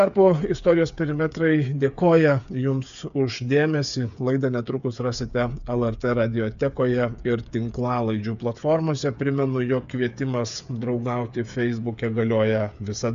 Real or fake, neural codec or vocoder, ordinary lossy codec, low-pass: fake; codec, 16 kHz, 8 kbps, FreqCodec, smaller model; AAC, 96 kbps; 7.2 kHz